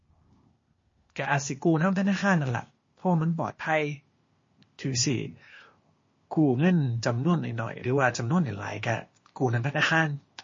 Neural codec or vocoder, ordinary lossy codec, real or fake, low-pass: codec, 16 kHz, 0.8 kbps, ZipCodec; MP3, 32 kbps; fake; 7.2 kHz